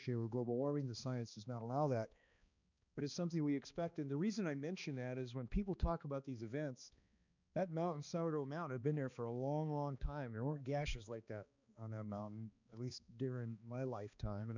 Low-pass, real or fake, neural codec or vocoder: 7.2 kHz; fake; codec, 16 kHz, 2 kbps, X-Codec, HuBERT features, trained on balanced general audio